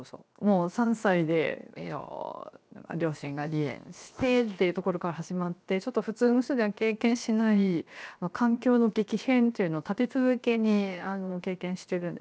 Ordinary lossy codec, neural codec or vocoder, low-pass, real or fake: none; codec, 16 kHz, 0.7 kbps, FocalCodec; none; fake